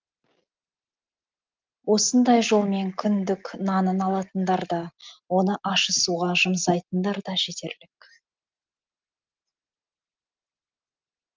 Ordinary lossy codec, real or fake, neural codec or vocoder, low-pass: Opus, 24 kbps; real; none; 7.2 kHz